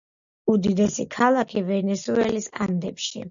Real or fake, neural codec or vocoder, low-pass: real; none; 7.2 kHz